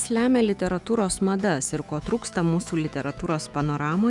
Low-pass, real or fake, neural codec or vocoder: 10.8 kHz; fake; autoencoder, 48 kHz, 128 numbers a frame, DAC-VAE, trained on Japanese speech